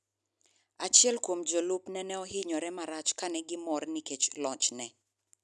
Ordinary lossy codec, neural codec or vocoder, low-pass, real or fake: none; none; none; real